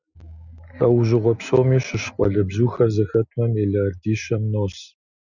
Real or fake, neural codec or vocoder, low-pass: real; none; 7.2 kHz